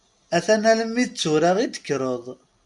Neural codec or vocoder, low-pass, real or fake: none; 10.8 kHz; real